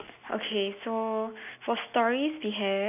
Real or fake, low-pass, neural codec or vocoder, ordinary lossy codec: real; 3.6 kHz; none; none